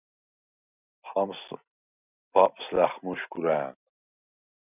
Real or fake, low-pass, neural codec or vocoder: real; 3.6 kHz; none